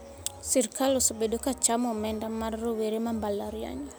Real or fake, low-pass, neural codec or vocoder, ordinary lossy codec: real; none; none; none